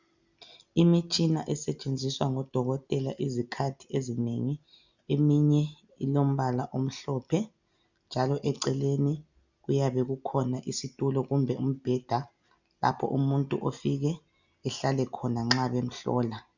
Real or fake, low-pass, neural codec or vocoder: real; 7.2 kHz; none